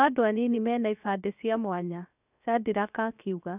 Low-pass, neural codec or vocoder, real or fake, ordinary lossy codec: 3.6 kHz; codec, 16 kHz, about 1 kbps, DyCAST, with the encoder's durations; fake; none